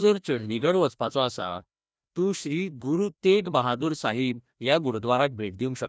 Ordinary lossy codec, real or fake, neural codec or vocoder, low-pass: none; fake; codec, 16 kHz, 1 kbps, FreqCodec, larger model; none